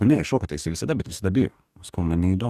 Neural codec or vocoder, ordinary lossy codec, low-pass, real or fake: codec, 44.1 kHz, 2.6 kbps, DAC; Opus, 64 kbps; 14.4 kHz; fake